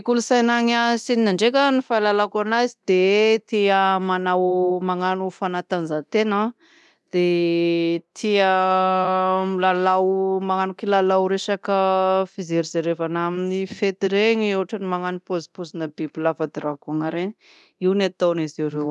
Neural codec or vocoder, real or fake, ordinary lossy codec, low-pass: codec, 24 kHz, 0.9 kbps, DualCodec; fake; none; none